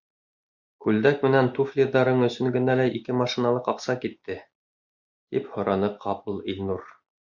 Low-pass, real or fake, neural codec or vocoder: 7.2 kHz; real; none